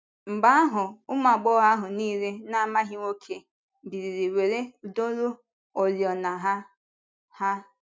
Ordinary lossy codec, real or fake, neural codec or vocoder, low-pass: none; real; none; none